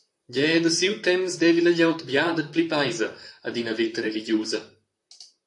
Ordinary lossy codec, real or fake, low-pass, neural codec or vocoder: AAC, 64 kbps; fake; 10.8 kHz; vocoder, 44.1 kHz, 128 mel bands, Pupu-Vocoder